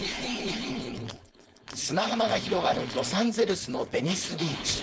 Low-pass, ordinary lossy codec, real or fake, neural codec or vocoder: none; none; fake; codec, 16 kHz, 4.8 kbps, FACodec